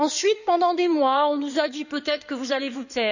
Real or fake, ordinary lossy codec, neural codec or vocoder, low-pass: fake; none; codec, 16 kHz in and 24 kHz out, 2.2 kbps, FireRedTTS-2 codec; 7.2 kHz